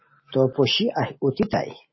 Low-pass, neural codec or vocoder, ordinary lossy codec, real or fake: 7.2 kHz; none; MP3, 24 kbps; real